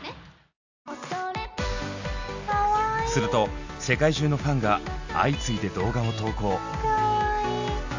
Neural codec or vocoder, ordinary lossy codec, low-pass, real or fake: none; AAC, 48 kbps; 7.2 kHz; real